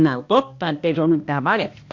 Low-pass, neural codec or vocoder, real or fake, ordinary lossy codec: 7.2 kHz; codec, 16 kHz, 1 kbps, X-Codec, HuBERT features, trained on balanced general audio; fake; MP3, 48 kbps